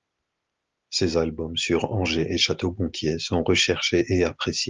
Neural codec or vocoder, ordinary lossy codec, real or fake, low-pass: none; Opus, 32 kbps; real; 7.2 kHz